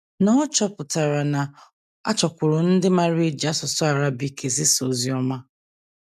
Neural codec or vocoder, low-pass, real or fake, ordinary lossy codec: none; 14.4 kHz; real; none